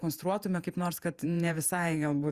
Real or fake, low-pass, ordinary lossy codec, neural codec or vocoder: fake; 14.4 kHz; Opus, 64 kbps; vocoder, 48 kHz, 128 mel bands, Vocos